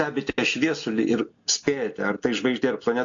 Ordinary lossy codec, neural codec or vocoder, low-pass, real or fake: AAC, 48 kbps; none; 7.2 kHz; real